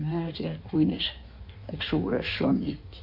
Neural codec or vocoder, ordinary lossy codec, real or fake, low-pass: codec, 16 kHz in and 24 kHz out, 1.1 kbps, FireRedTTS-2 codec; AAC, 48 kbps; fake; 5.4 kHz